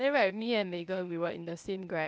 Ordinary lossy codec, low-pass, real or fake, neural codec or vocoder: none; none; fake; codec, 16 kHz, 0.8 kbps, ZipCodec